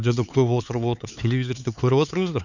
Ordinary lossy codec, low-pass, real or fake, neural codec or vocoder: none; 7.2 kHz; fake; codec, 16 kHz, 4 kbps, X-Codec, HuBERT features, trained on LibriSpeech